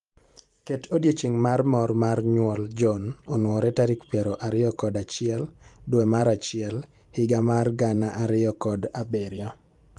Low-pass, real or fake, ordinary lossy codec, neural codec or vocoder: 10.8 kHz; fake; Opus, 32 kbps; vocoder, 44.1 kHz, 128 mel bands every 512 samples, BigVGAN v2